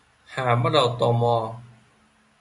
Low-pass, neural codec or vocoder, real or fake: 10.8 kHz; none; real